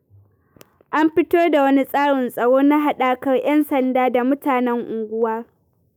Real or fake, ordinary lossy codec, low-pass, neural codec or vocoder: fake; none; none; autoencoder, 48 kHz, 128 numbers a frame, DAC-VAE, trained on Japanese speech